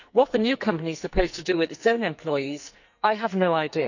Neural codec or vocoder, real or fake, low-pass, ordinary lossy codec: codec, 44.1 kHz, 2.6 kbps, SNAC; fake; 7.2 kHz; none